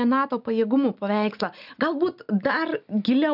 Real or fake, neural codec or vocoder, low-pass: real; none; 5.4 kHz